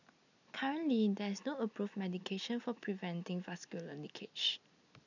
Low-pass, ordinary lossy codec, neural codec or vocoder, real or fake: 7.2 kHz; none; none; real